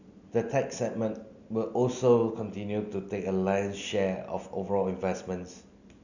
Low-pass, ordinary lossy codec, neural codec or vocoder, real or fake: 7.2 kHz; none; none; real